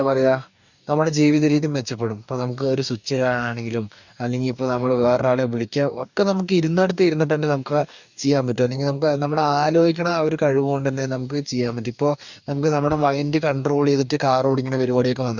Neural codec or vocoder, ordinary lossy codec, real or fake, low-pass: codec, 44.1 kHz, 2.6 kbps, DAC; none; fake; 7.2 kHz